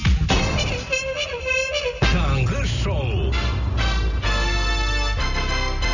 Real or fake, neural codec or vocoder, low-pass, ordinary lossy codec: real; none; 7.2 kHz; none